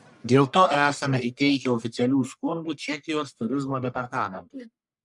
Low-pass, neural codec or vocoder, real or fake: 10.8 kHz; codec, 44.1 kHz, 1.7 kbps, Pupu-Codec; fake